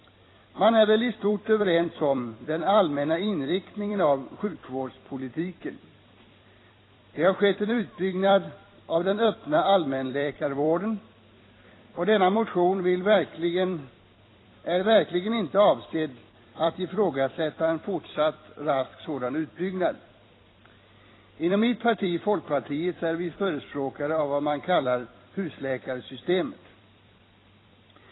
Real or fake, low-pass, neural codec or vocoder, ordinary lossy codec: fake; 7.2 kHz; vocoder, 44.1 kHz, 128 mel bands every 256 samples, BigVGAN v2; AAC, 16 kbps